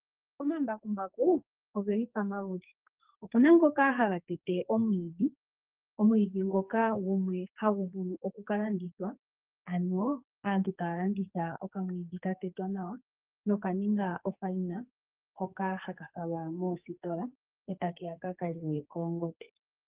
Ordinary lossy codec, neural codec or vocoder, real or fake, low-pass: Opus, 32 kbps; codec, 44.1 kHz, 2.6 kbps, SNAC; fake; 3.6 kHz